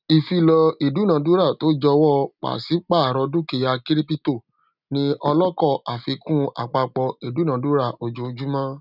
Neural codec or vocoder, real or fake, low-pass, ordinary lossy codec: none; real; 5.4 kHz; none